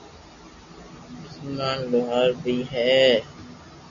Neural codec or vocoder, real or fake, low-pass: none; real; 7.2 kHz